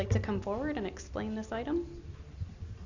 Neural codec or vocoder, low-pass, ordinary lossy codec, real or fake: none; 7.2 kHz; AAC, 48 kbps; real